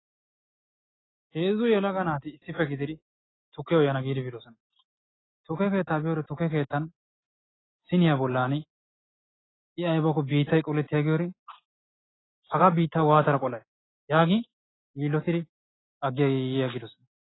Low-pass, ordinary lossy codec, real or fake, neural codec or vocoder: 7.2 kHz; AAC, 16 kbps; real; none